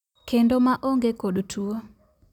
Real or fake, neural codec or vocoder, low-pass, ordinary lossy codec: real; none; 19.8 kHz; none